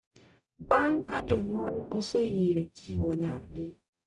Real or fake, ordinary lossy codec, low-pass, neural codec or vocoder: fake; none; 10.8 kHz; codec, 44.1 kHz, 0.9 kbps, DAC